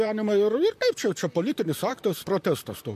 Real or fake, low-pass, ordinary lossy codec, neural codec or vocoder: fake; 14.4 kHz; MP3, 64 kbps; vocoder, 44.1 kHz, 128 mel bands, Pupu-Vocoder